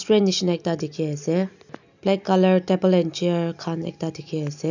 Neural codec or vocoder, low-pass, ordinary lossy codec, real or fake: none; 7.2 kHz; none; real